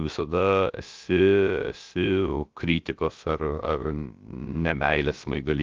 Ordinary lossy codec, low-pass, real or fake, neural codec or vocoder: Opus, 32 kbps; 7.2 kHz; fake; codec, 16 kHz, about 1 kbps, DyCAST, with the encoder's durations